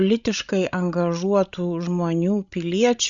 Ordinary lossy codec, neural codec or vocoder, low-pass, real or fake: Opus, 64 kbps; codec, 16 kHz, 16 kbps, FreqCodec, larger model; 7.2 kHz; fake